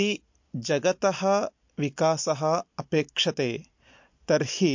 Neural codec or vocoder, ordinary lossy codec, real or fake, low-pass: none; MP3, 48 kbps; real; 7.2 kHz